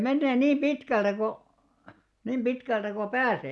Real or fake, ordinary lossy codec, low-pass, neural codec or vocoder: real; none; none; none